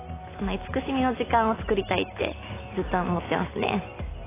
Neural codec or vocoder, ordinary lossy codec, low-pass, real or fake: none; AAC, 16 kbps; 3.6 kHz; real